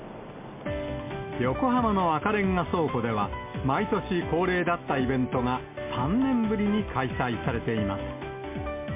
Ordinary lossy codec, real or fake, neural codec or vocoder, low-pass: MP3, 24 kbps; real; none; 3.6 kHz